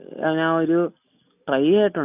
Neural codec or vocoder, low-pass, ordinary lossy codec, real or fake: none; 3.6 kHz; none; real